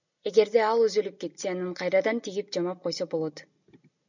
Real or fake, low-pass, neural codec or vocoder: real; 7.2 kHz; none